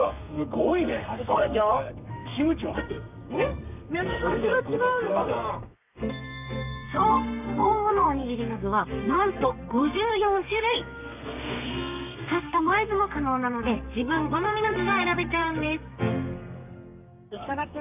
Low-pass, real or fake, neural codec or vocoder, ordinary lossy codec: 3.6 kHz; fake; codec, 32 kHz, 1.9 kbps, SNAC; none